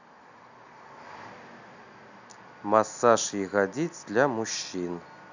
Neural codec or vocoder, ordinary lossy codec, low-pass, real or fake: none; none; 7.2 kHz; real